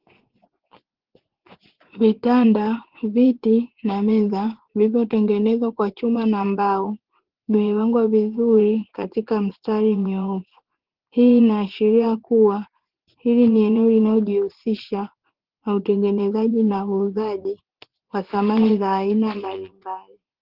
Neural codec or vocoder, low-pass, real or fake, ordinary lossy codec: vocoder, 24 kHz, 100 mel bands, Vocos; 5.4 kHz; fake; Opus, 16 kbps